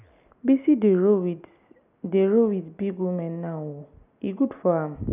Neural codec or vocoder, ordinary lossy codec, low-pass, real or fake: none; none; 3.6 kHz; real